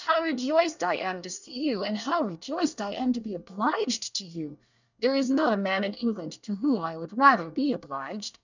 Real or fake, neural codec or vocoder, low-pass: fake; codec, 24 kHz, 1 kbps, SNAC; 7.2 kHz